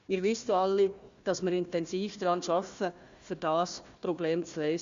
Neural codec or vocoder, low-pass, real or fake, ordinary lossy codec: codec, 16 kHz, 1 kbps, FunCodec, trained on Chinese and English, 50 frames a second; 7.2 kHz; fake; Opus, 64 kbps